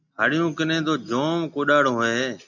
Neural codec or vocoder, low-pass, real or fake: none; 7.2 kHz; real